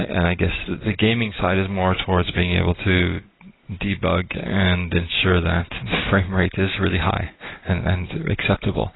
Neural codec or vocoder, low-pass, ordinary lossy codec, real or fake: none; 7.2 kHz; AAC, 16 kbps; real